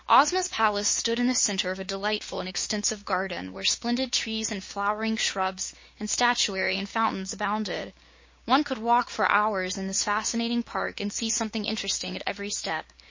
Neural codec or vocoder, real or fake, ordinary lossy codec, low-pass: codec, 16 kHz, 6 kbps, DAC; fake; MP3, 32 kbps; 7.2 kHz